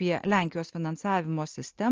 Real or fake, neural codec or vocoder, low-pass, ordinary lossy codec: real; none; 7.2 kHz; Opus, 24 kbps